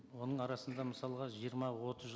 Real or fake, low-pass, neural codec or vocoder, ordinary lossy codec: real; none; none; none